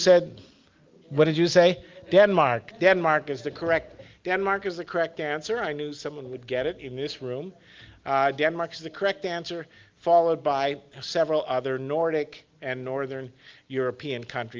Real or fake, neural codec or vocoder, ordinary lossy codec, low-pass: real; none; Opus, 32 kbps; 7.2 kHz